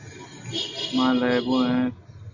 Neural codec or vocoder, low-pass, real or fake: none; 7.2 kHz; real